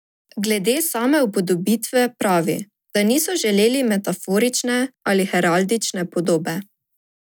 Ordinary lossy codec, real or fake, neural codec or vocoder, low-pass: none; real; none; none